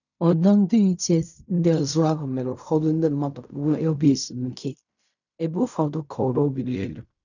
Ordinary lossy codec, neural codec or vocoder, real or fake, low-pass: none; codec, 16 kHz in and 24 kHz out, 0.4 kbps, LongCat-Audio-Codec, fine tuned four codebook decoder; fake; 7.2 kHz